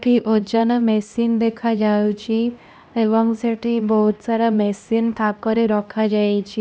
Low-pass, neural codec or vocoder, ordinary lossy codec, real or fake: none; codec, 16 kHz, 1 kbps, X-Codec, HuBERT features, trained on LibriSpeech; none; fake